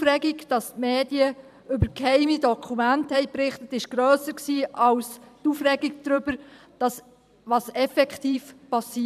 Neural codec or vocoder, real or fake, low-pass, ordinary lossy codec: vocoder, 44.1 kHz, 128 mel bands every 512 samples, BigVGAN v2; fake; 14.4 kHz; none